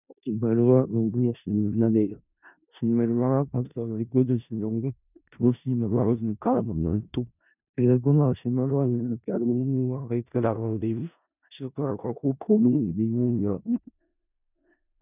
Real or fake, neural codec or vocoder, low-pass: fake; codec, 16 kHz in and 24 kHz out, 0.4 kbps, LongCat-Audio-Codec, four codebook decoder; 3.6 kHz